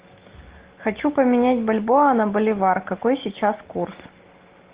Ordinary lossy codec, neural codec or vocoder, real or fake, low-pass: Opus, 16 kbps; none; real; 3.6 kHz